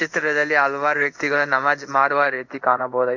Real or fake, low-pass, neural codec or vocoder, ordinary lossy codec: fake; 7.2 kHz; codec, 16 kHz in and 24 kHz out, 1 kbps, XY-Tokenizer; Opus, 64 kbps